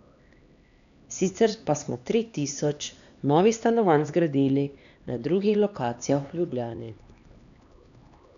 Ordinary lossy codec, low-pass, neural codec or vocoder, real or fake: none; 7.2 kHz; codec, 16 kHz, 2 kbps, X-Codec, HuBERT features, trained on LibriSpeech; fake